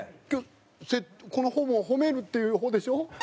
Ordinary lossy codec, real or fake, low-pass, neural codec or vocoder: none; real; none; none